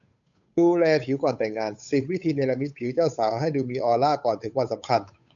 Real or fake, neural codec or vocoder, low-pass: fake; codec, 16 kHz, 8 kbps, FunCodec, trained on Chinese and English, 25 frames a second; 7.2 kHz